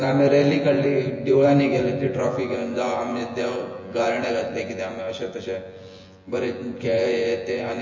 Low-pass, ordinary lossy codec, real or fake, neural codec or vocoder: 7.2 kHz; MP3, 32 kbps; fake; vocoder, 24 kHz, 100 mel bands, Vocos